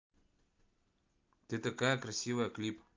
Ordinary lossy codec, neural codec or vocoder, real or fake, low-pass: Opus, 32 kbps; none; real; 7.2 kHz